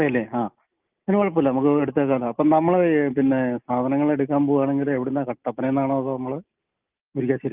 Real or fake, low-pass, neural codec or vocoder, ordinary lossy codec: real; 3.6 kHz; none; Opus, 24 kbps